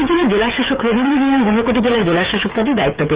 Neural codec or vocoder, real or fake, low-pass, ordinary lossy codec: codec, 16 kHz, 8 kbps, FreqCodec, smaller model; fake; 3.6 kHz; Opus, 32 kbps